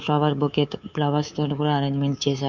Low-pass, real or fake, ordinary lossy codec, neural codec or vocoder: 7.2 kHz; fake; none; codec, 24 kHz, 3.1 kbps, DualCodec